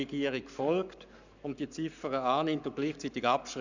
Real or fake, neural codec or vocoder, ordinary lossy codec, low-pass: fake; codec, 44.1 kHz, 7.8 kbps, Pupu-Codec; none; 7.2 kHz